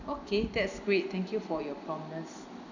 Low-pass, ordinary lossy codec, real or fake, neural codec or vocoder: 7.2 kHz; none; real; none